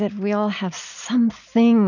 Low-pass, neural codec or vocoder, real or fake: 7.2 kHz; none; real